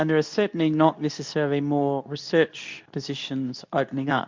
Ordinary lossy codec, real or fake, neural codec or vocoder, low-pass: MP3, 64 kbps; fake; codec, 24 kHz, 0.9 kbps, WavTokenizer, medium speech release version 2; 7.2 kHz